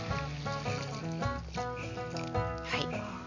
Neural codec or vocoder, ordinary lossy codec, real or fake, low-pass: none; none; real; 7.2 kHz